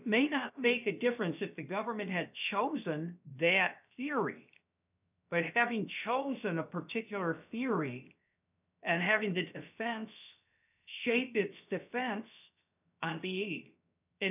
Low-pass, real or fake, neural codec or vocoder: 3.6 kHz; fake; codec, 16 kHz, 0.7 kbps, FocalCodec